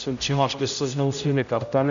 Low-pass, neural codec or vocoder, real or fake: 7.2 kHz; codec, 16 kHz, 0.5 kbps, X-Codec, HuBERT features, trained on general audio; fake